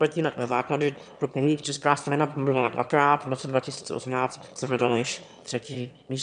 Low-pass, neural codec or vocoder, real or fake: 9.9 kHz; autoencoder, 22.05 kHz, a latent of 192 numbers a frame, VITS, trained on one speaker; fake